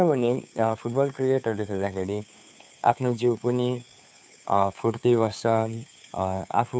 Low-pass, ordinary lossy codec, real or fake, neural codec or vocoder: none; none; fake; codec, 16 kHz, 2 kbps, FunCodec, trained on Chinese and English, 25 frames a second